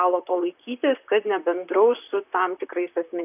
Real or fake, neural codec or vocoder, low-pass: fake; vocoder, 44.1 kHz, 128 mel bands, Pupu-Vocoder; 3.6 kHz